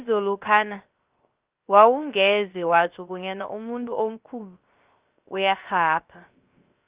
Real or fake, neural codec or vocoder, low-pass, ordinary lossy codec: fake; codec, 16 kHz, 0.3 kbps, FocalCodec; 3.6 kHz; Opus, 32 kbps